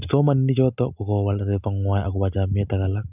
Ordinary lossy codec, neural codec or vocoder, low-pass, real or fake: none; none; 3.6 kHz; real